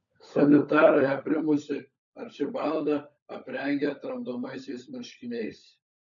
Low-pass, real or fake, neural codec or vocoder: 7.2 kHz; fake; codec, 16 kHz, 16 kbps, FunCodec, trained on LibriTTS, 50 frames a second